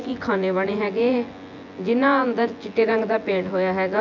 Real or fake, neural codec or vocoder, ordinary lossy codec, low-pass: fake; vocoder, 24 kHz, 100 mel bands, Vocos; MP3, 64 kbps; 7.2 kHz